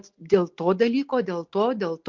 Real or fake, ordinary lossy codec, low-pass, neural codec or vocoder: real; MP3, 48 kbps; 7.2 kHz; none